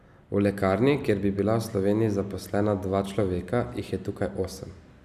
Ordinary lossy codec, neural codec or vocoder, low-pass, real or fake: none; none; 14.4 kHz; real